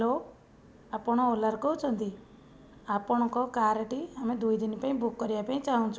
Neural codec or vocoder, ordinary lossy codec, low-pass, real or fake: none; none; none; real